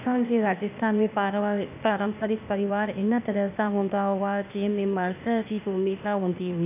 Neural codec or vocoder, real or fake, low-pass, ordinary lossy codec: codec, 16 kHz, 0.8 kbps, ZipCodec; fake; 3.6 kHz; none